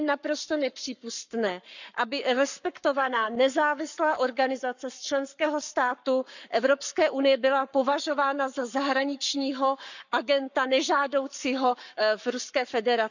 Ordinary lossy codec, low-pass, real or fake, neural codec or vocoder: none; 7.2 kHz; fake; codec, 44.1 kHz, 7.8 kbps, Pupu-Codec